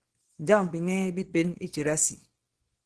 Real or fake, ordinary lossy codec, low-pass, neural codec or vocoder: fake; Opus, 16 kbps; 10.8 kHz; codec, 24 kHz, 0.9 kbps, WavTokenizer, small release